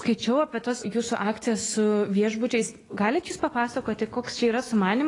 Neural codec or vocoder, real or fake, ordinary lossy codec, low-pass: autoencoder, 48 kHz, 128 numbers a frame, DAC-VAE, trained on Japanese speech; fake; AAC, 32 kbps; 10.8 kHz